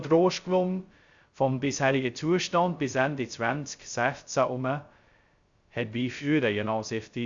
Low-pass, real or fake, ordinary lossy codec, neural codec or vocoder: 7.2 kHz; fake; Opus, 64 kbps; codec, 16 kHz, 0.2 kbps, FocalCodec